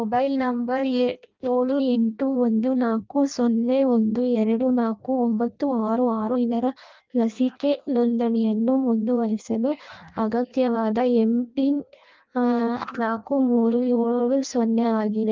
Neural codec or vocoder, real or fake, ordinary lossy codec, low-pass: codec, 16 kHz in and 24 kHz out, 0.6 kbps, FireRedTTS-2 codec; fake; Opus, 24 kbps; 7.2 kHz